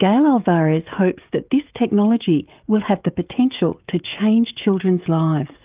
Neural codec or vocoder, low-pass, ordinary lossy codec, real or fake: codec, 16 kHz, 16 kbps, FreqCodec, smaller model; 3.6 kHz; Opus, 32 kbps; fake